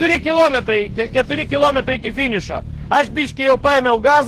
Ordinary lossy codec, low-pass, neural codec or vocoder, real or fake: Opus, 16 kbps; 14.4 kHz; codec, 44.1 kHz, 2.6 kbps, DAC; fake